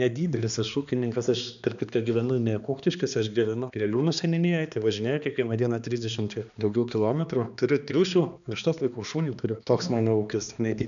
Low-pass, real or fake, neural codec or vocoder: 7.2 kHz; fake; codec, 16 kHz, 2 kbps, X-Codec, HuBERT features, trained on balanced general audio